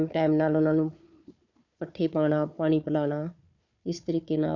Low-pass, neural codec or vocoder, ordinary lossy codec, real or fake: 7.2 kHz; codec, 16 kHz, 4 kbps, FunCodec, trained on Chinese and English, 50 frames a second; none; fake